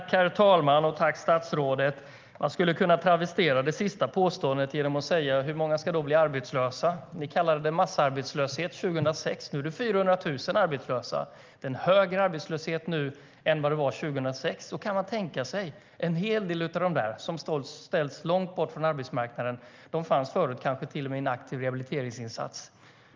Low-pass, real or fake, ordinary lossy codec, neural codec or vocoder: 7.2 kHz; real; Opus, 24 kbps; none